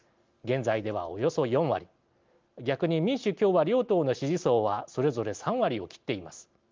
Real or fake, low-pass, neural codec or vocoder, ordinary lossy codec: real; 7.2 kHz; none; Opus, 32 kbps